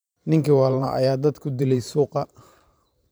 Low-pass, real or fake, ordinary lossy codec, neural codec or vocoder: none; fake; none; vocoder, 44.1 kHz, 128 mel bands every 512 samples, BigVGAN v2